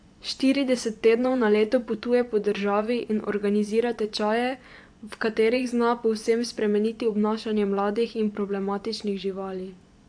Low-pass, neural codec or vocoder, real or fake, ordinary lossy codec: 9.9 kHz; vocoder, 24 kHz, 100 mel bands, Vocos; fake; AAC, 64 kbps